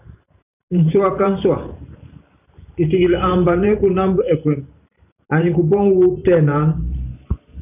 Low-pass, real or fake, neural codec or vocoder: 3.6 kHz; fake; vocoder, 44.1 kHz, 128 mel bands every 512 samples, BigVGAN v2